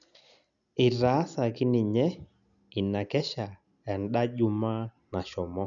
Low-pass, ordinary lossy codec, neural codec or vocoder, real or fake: 7.2 kHz; none; none; real